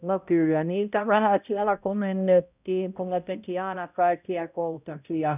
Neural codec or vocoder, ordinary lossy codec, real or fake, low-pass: codec, 16 kHz, 0.5 kbps, X-Codec, HuBERT features, trained on balanced general audio; none; fake; 3.6 kHz